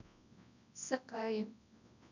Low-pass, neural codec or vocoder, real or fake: 7.2 kHz; codec, 24 kHz, 0.9 kbps, WavTokenizer, large speech release; fake